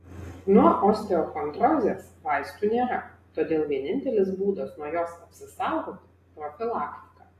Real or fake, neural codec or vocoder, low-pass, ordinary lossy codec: real; none; 14.4 kHz; AAC, 48 kbps